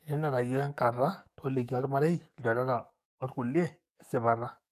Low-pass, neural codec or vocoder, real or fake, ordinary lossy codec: 14.4 kHz; codec, 44.1 kHz, 7.8 kbps, DAC; fake; none